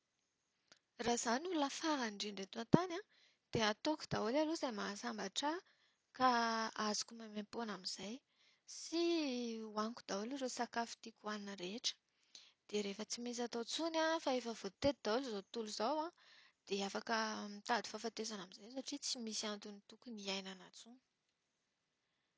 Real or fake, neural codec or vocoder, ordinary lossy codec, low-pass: real; none; none; none